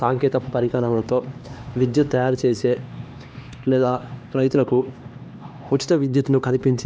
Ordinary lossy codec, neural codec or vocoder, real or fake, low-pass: none; codec, 16 kHz, 2 kbps, X-Codec, HuBERT features, trained on LibriSpeech; fake; none